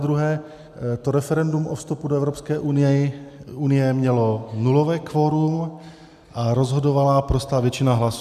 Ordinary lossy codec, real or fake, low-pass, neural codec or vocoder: AAC, 96 kbps; real; 14.4 kHz; none